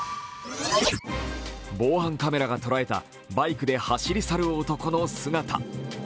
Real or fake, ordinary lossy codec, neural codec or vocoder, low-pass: real; none; none; none